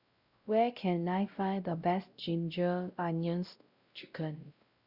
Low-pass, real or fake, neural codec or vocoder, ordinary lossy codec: 5.4 kHz; fake; codec, 16 kHz, 0.5 kbps, X-Codec, WavLM features, trained on Multilingual LibriSpeech; Opus, 64 kbps